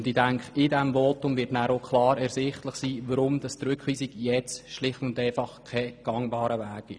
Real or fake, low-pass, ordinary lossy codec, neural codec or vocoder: real; 9.9 kHz; none; none